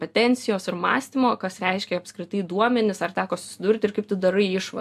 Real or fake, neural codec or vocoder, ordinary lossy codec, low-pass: real; none; AAC, 96 kbps; 14.4 kHz